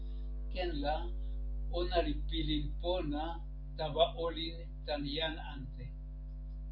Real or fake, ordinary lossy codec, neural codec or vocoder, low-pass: real; MP3, 32 kbps; none; 5.4 kHz